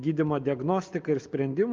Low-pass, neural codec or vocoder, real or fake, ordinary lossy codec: 7.2 kHz; none; real; Opus, 24 kbps